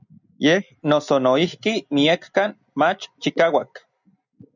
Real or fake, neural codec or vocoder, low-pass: real; none; 7.2 kHz